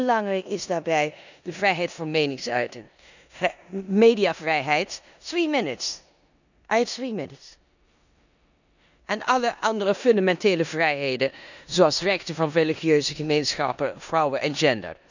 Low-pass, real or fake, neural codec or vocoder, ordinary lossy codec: 7.2 kHz; fake; codec, 16 kHz in and 24 kHz out, 0.9 kbps, LongCat-Audio-Codec, four codebook decoder; none